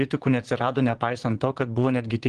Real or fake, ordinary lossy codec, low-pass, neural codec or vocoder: fake; Opus, 16 kbps; 9.9 kHz; vocoder, 22.05 kHz, 80 mel bands, Vocos